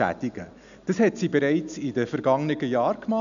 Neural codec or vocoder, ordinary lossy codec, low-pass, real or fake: none; none; 7.2 kHz; real